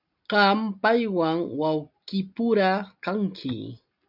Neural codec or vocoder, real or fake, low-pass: none; real; 5.4 kHz